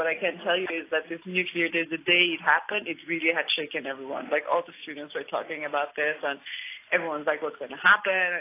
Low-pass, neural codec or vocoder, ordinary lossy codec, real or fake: 3.6 kHz; none; AAC, 24 kbps; real